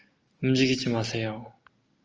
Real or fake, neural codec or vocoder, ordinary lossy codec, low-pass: real; none; Opus, 24 kbps; 7.2 kHz